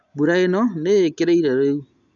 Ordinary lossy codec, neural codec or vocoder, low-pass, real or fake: none; none; 7.2 kHz; real